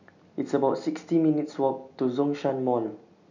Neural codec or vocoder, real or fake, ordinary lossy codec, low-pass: none; real; none; 7.2 kHz